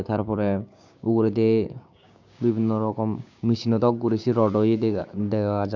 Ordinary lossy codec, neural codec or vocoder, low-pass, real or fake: none; codec, 16 kHz, 6 kbps, DAC; 7.2 kHz; fake